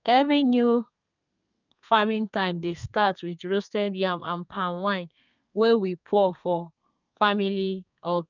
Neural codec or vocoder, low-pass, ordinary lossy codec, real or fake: codec, 32 kHz, 1.9 kbps, SNAC; 7.2 kHz; none; fake